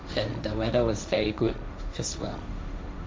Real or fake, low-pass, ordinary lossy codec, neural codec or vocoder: fake; none; none; codec, 16 kHz, 1.1 kbps, Voila-Tokenizer